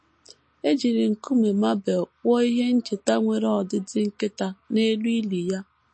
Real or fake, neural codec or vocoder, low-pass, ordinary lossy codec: real; none; 9.9 kHz; MP3, 32 kbps